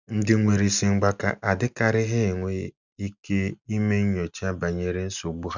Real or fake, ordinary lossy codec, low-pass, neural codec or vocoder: real; none; 7.2 kHz; none